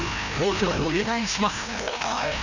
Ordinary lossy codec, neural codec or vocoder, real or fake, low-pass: none; codec, 16 kHz, 1 kbps, FreqCodec, larger model; fake; 7.2 kHz